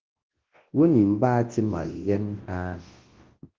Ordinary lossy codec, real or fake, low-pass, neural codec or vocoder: Opus, 16 kbps; fake; 7.2 kHz; codec, 24 kHz, 0.9 kbps, WavTokenizer, large speech release